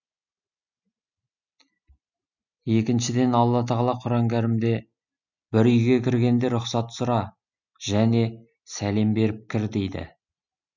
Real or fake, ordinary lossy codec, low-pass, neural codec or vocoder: real; none; 7.2 kHz; none